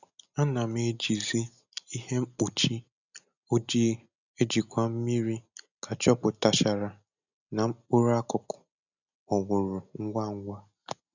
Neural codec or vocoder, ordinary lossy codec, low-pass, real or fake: none; none; 7.2 kHz; real